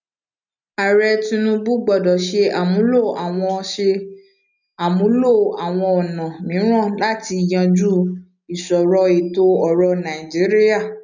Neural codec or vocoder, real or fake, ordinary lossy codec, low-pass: none; real; none; 7.2 kHz